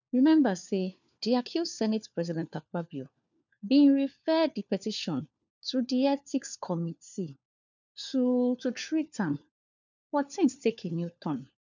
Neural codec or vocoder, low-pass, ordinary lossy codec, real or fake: codec, 16 kHz, 4 kbps, FunCodec, trained on LibriTTS, 50 frames a second; 7.2 kHz; none; fake